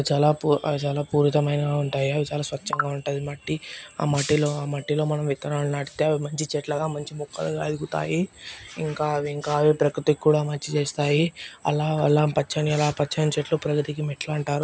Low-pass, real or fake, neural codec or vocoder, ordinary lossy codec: none; real; none; none